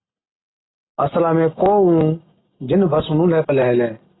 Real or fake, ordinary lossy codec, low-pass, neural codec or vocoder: real; AAC, 16 kbps; 7.2 kHz; none